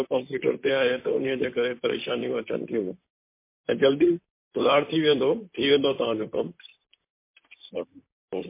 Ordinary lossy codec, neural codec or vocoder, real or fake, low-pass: MP3, 24 kbps; vocoder, 44.1 kHz, 128 mel bands, Pupu-Vocoder; fake; 3.6 kHz